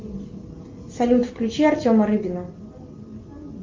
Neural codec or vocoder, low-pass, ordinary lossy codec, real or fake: none; 7.2 kHz; Opus, 32 kbps; real